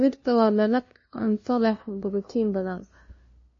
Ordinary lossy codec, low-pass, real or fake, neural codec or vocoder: MP3, 32 kbps; 7.2 kHz; fake; codec, 16 kHz, 0.5 kbps, FunCodec, trained on LibriTTS, 25 frames a second